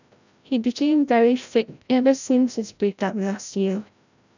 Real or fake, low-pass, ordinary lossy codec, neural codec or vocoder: fake; 7.2 kHz; none; codec, 16 kHz, 0.5 kbps, FreqCodec, larger model